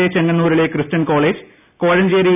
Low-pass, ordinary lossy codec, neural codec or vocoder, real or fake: 3.6 kHz; none; none; real